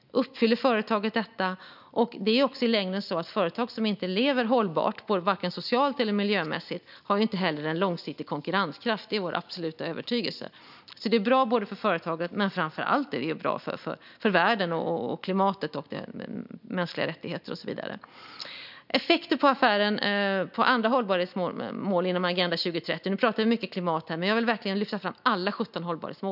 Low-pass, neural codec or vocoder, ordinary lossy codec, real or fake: 5.4 kHz; none; none; real